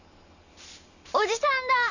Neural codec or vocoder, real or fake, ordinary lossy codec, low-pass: vocoder, 44.1 kHz, 128 mel bands every 512 samples, BigVGAN v2; fake; none; 7.2 kHz